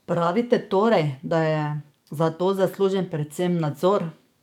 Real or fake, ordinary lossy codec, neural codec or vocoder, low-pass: fake; none; codec, 44.1 kHz, 7.8 kbps, DAC; 19.8 kHz